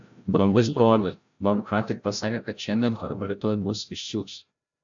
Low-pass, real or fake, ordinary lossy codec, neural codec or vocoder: 7.2 kHz; fake; AAC, 64 kbps; codec, 16 kHz, 0.5 kbps, FreqCodec, larger model